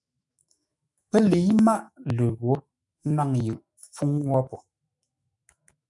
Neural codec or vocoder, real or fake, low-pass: autoencoder, 48 kHz, 128 numbers a frame, DAC-VAE, trained on Japanese speech; fake; 10.8 kHz